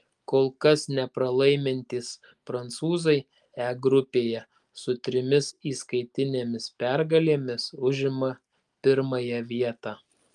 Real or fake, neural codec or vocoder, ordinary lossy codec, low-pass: real; none; Opus, 32 kbps; 10.8 kHz